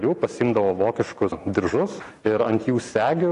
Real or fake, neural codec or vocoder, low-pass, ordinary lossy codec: real; none; 10.8 kHz; AAC, 64 kbps